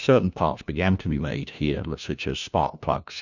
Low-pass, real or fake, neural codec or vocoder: 7.2 kHz; fake; codec, 16 kHz, 1 kbps, FunCodec, trained on LibriTTS, 50 frames a second